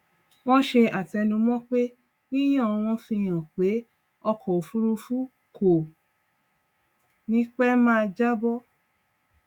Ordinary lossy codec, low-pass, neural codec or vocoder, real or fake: Opus, 64 kbps; 19.8 kHz; autoencoder, 48 kHz, 128 numbers a frame, DAC-VAE, trained on Japanese speech; fake